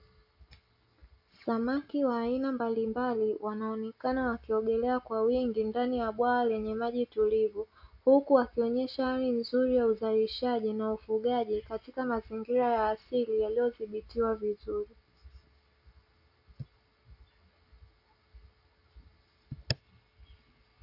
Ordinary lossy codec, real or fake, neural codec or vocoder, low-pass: AAC, 48 kbps; real; none; 5.4 kHz